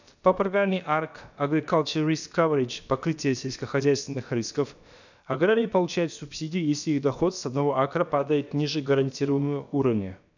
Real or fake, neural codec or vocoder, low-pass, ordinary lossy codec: fake; codec, 16 kHz, about 1 kbps, DyCAST, with the encoder's durations; 7.2 kHz; none